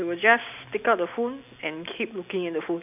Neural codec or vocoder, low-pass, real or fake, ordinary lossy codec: none; 3.6 kHz; real; none